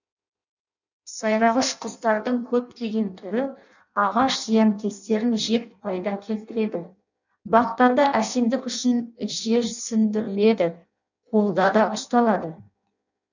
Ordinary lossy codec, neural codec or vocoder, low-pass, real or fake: none; codec, 16 kHz in and 24 kHz out, 0.6 kbps, FireRedTTS-2 codec; 7.2 kHz; fake